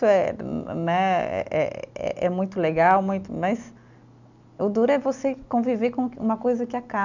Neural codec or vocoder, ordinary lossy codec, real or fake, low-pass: none; none; real; 7.2 kHz